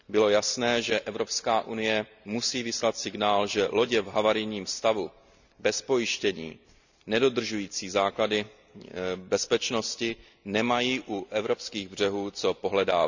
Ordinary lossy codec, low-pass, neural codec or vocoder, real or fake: none; 7.2 kHz; none; real